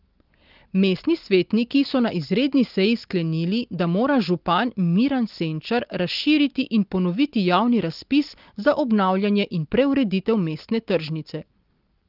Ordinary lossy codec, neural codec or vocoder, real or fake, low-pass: Opus, 32 kbps; none; real; 5.4 kHz